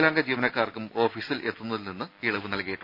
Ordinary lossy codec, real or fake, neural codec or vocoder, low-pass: none; real; none; 5.4 kHz